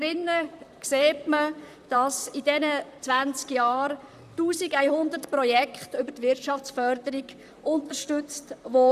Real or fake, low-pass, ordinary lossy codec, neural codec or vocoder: fake; 14.4 kHz; none; vocoder, 44.1 kHz, 128 mel bands, Pupu-Vocoder